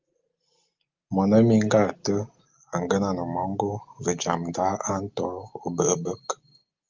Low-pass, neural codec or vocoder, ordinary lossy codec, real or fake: 7.2 kHz; none; Opus, 32 kbps; real